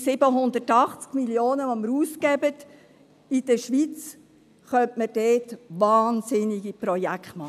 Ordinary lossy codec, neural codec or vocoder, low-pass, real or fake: none; none; 14.4 kHz; real